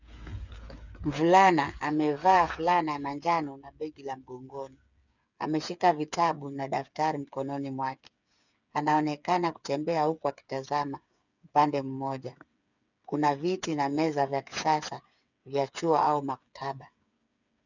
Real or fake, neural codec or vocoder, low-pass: fake; codec, 16 kHz, 8 kbps, FreqCodec, smaller model; 7.2 kHz